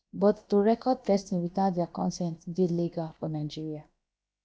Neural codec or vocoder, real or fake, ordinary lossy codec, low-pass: codec, 16 kHz, about 1 kbps, DyCAST, with the encoder's durations; fake; none; none